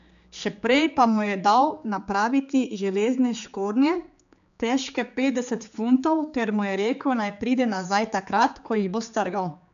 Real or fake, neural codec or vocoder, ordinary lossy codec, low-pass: fake; codec, 16 kHz, 4 kbps, X-Codec, HuBERT features, trained on general audio; none; 7.2 kHz